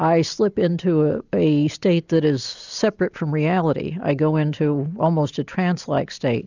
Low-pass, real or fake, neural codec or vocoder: 7.2 kHz; real; none